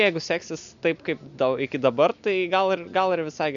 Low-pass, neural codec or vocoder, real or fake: 7.2 kHz; none; real